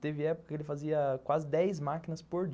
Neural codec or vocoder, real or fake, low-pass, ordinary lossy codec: none; real; none; none